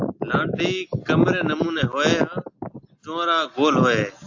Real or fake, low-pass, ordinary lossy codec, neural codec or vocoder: real; 7.2 kHz; AAC, 48 kbps; none